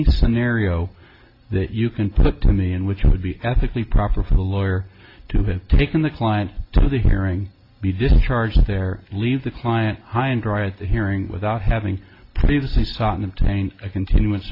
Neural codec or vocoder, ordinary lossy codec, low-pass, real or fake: none; AAC, 32 kbps; 5.4 kHz; real